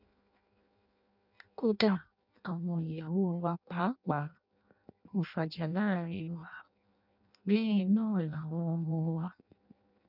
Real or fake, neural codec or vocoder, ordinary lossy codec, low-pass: fake; codec, 16 kHz in and 24 kHz out, 0.6 kbps, FireRedTTS-2 codec; none; 5.4 kHz